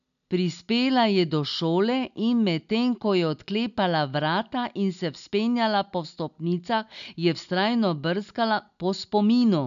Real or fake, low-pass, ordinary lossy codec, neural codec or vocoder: real; 7.2 kHz; none; none